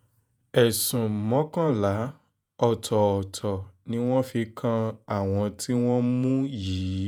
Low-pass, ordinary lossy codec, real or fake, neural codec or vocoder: none; none; fake; vocoder, 48 kHz, 128 mel bands, Vocos